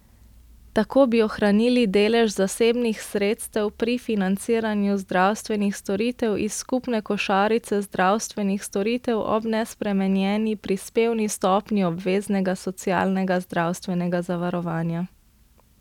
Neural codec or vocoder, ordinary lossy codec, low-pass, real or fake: none; none; 19.8 kHz; real